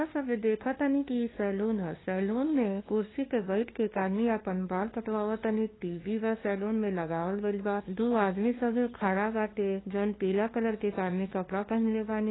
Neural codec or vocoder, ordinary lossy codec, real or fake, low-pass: codec, 16 kHz, 2 kbps, FunCodec, trained on LibriTTS, 25 frames a second; AAC, 16 kbps; fake; 7.2 kHz